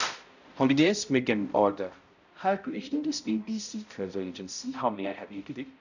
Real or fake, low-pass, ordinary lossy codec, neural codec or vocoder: fake; 7.2 kHz; none; codec, 16 kHz, 0.5 kbps, X-Codec, HuBERT features, trained on balanced general audio